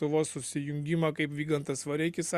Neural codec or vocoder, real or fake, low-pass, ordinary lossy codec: none; real; 14.4 kHz; Opus, 64 kbps